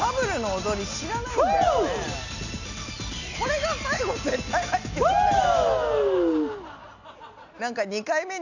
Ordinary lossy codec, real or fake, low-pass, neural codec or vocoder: none; real; 7.2 kHz; none